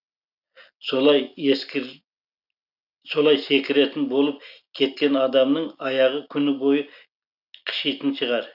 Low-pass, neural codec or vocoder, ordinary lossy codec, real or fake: 5.4 kHz; none; none; real